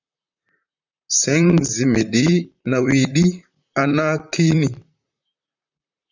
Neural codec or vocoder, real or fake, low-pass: vocoder, 44.1 kHz, 128 mel bands, Pupu-Vocoder; fake; 7.2 kHz